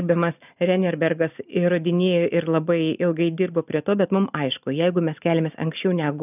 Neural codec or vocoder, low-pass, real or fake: none; 3.6 kHz; real